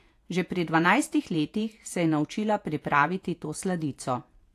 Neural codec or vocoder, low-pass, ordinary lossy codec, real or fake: vocoder, 48 kHz, 128 mel bands, Vocos; 14.4 kHz; AAC, 64 kbps; fake